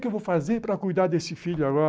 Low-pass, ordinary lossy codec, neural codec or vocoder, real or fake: none; none; none; real